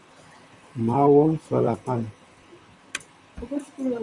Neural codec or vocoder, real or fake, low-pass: vocoder, 44.1 kHz, 128 mel bands, Pupu-Vocoder; fake; 10.8 kHz